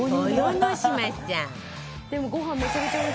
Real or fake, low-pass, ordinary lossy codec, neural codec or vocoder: real; none; none; none